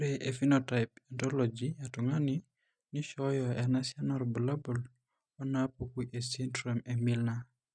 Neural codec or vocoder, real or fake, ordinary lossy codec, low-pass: none; real; none; 9.9 kHz